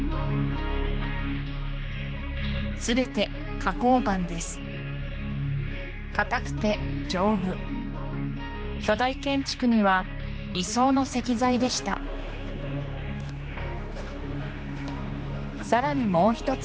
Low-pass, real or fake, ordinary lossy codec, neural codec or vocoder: none; fake; none; codec, 16 kHz, 2 kbps, X-Codec, HuBERT features, trained on general audio